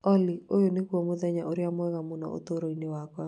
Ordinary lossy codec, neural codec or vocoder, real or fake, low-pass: none; none; real; 10.8 kHz